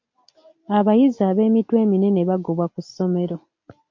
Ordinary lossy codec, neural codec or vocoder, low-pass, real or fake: MP3, 48 kbps; none; 7.2 kHz; real